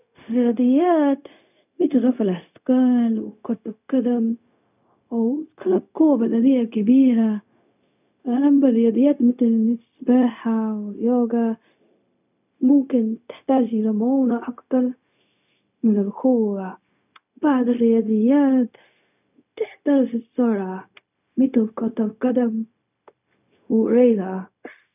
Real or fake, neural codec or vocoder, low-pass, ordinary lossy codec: fake; codec, 16 kHz, 0.4 kbps, LongCat-Audio-Codec; 3.6 kHz; none